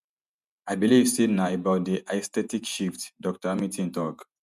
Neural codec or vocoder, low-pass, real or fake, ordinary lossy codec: vocoder, 48 kHz, 128 mel bands, Vocos; 14.4 kHz; fake; none